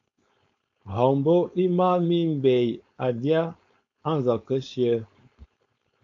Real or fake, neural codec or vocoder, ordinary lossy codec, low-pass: fake; codec, 16 kHz, 4.8 kbps, FACodec; AAC, 48 kbps; 7.2 kHz